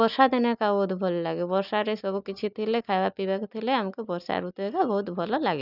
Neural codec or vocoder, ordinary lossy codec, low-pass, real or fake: none; none; 5.4 kHz; real